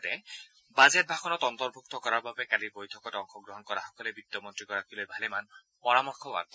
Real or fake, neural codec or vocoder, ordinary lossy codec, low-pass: real; none; none; none